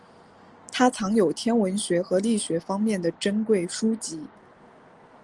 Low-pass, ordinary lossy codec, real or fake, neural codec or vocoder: 10.8 kHz; Opus, 24 kbps; real; none